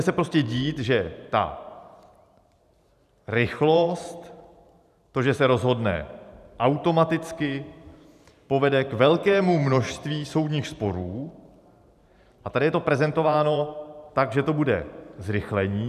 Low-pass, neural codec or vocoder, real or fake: 14.4 kHz; vocoder, 44.1 kHz, 128 mel bands every 512 samples, BigVGAN v2; fake